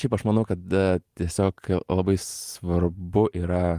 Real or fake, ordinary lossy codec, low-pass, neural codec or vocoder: real; Opus, 24 kbps; 14.4 kHz; none